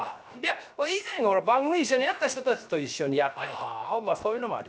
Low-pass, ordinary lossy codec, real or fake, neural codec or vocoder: none; none; fake; codec, 16 kHz, 0.7 kbps, FocalCodec